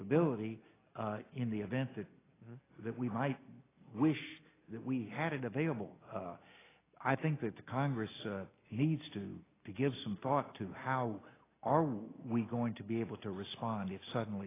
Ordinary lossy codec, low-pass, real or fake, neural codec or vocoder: AAC, 16 kbps; 3.6 kHz; real; none